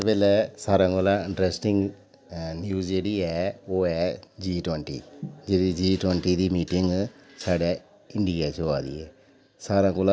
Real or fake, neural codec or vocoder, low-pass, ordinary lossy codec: real; none; none; none